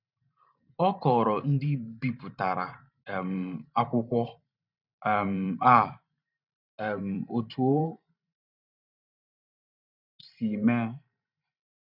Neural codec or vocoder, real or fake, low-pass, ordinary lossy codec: vocoder, 44.1 kHz, 128 mel bands every 512 samples, BigVGAN v2; fake; 5.4 kHz; none